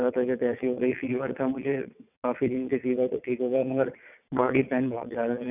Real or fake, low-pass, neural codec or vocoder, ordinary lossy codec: fake; 3.6 kHz; vocoder, 22.05 kHz, 80 mel bands, WaveNeXt; none